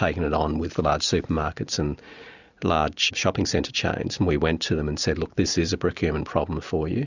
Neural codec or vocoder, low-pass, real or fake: none; 7.2 kHz; real